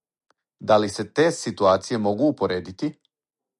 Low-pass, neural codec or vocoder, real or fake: 10.8 kHz; none; real